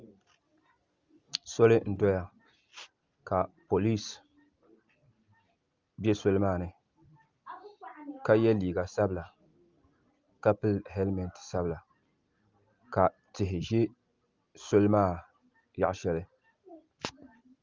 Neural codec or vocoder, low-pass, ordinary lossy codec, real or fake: none; 7.2 kHz; Opus, 32 kbps; real